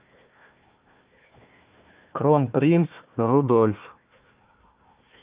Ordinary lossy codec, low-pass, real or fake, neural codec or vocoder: Opus, 24 kbps; 3.6 kHz; fake; codec, 16 kHz, 1 kbps, FunCodec, trained on Chinese and English, 50 frames a second